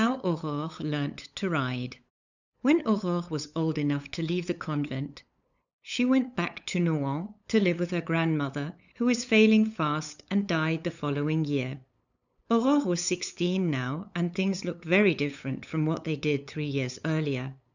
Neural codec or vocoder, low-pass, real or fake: codec, 16 kHz, 8 kbps, FunCodec, trained on LibriTTS, 25 frames a second; 7.2 kHz; fake